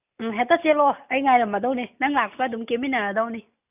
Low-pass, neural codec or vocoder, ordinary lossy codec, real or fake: 3.6 kHz; none; none; real